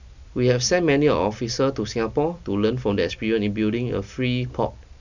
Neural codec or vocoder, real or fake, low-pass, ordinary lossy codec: none; real; 7.2 kHz; none